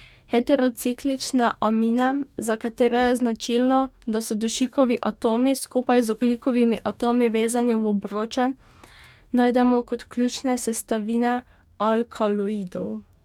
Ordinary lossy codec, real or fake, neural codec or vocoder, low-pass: none; fake; codec, 44.1 kHz, 2.6 kbps, DAC; 19.8 kHz